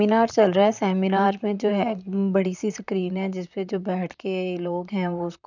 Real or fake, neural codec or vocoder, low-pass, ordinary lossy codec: fake; vocoder, 44.1 kHz, 128 mel bands, Pupu-Vocoder; 7.2 kHz; none